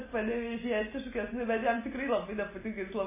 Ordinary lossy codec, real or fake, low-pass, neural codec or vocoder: MP3, 16 kbps; real; 3.6 kHz; none